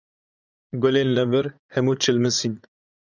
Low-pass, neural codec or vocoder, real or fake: 7.2 kHz; vocoder, 44.1 kHz, 80 mel bands, Vocos; fake